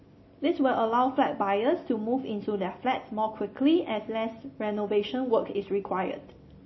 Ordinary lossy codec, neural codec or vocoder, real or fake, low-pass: MP3, 24 kbps; none; real; 7.2 kHz